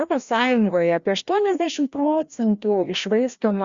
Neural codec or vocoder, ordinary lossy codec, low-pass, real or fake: codec, 16 kHz, 1 kbps, FreqCodec, larger model; Opus, 64 kbps; 7.2 kHz; fake